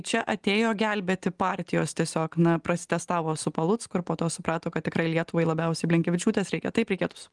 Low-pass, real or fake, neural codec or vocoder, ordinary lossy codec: 10.8 kHz; real; none; Opus, 24 kbps